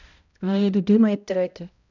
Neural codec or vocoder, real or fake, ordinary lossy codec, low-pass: codec, 16 kHz, 0.5 kbps, X-Codec, HuBERT features, trained on balanced general audio; fake; none; 7.2 kHz